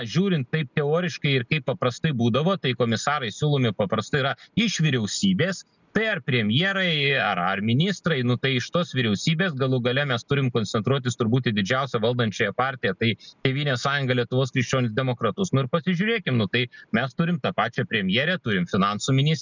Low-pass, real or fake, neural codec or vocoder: 7.2 kHz; real; none